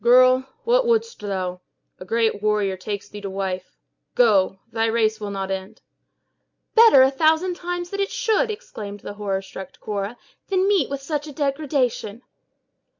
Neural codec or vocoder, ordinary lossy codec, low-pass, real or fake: none; MP3, 64 kbps; 7.2 kHz; real